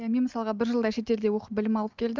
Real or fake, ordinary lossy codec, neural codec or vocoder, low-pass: real; Opus, 32 kbps; none; 7.2 kHz